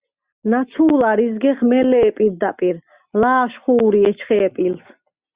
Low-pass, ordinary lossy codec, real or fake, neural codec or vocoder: 3.6 kHz; Opus, 64 kbps; real; none